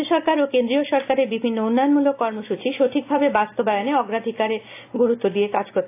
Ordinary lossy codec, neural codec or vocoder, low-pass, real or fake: AAC, 24 kbps; none; 3.6 kHz; real